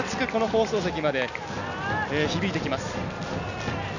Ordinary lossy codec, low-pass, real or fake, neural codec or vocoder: none; 7.2 kHz; real; none